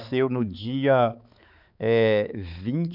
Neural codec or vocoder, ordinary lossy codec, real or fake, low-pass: codec, 16 kHz, 4 kbps, X-Codec, HuBERT features, trained on balanced general audio; none; fake; 5.4 kHz